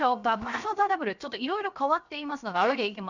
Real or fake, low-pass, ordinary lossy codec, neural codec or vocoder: fake; 7.2 kHz; none; codec, 16 kHz, 0.7 kbps, FocalCodec